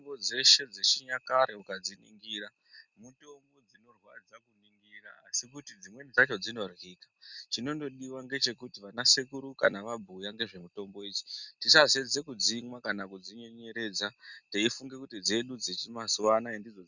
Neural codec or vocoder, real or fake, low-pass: none; real; 7.2 kHz